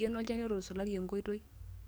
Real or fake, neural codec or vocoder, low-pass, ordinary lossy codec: fake; codec, 44.1 kHz, 7.8 kbps, DAC; none; none